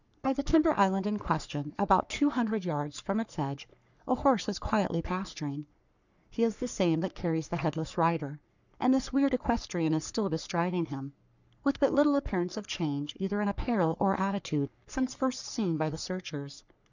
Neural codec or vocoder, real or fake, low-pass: codec, 44.1 kHz, 3.4 kbps, Pupu-Codec; fake; 7.2 kHz